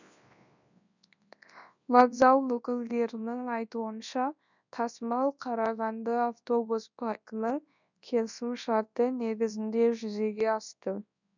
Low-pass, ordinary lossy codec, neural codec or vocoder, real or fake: 7.2 kHz; none; codec, 24 kHz, 0.9 kbps, WavTokenizer, large speech release; fake